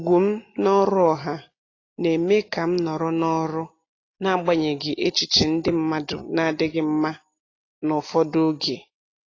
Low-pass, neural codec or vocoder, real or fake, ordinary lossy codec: 7.2 kHz; none; real; AAC, 32 kbps